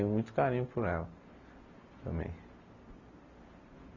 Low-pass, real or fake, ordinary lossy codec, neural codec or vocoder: 7.2 kHz; real; none; none